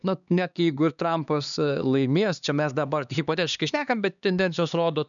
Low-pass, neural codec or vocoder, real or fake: 7.2 kHz; codec, 16 kHz, 2 kbps, X-Codec, HuBERT features, trained on LibriSpeech; fake